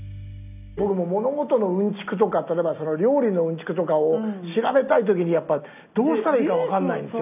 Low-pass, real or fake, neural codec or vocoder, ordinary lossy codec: 3.6 kHz; real; none; none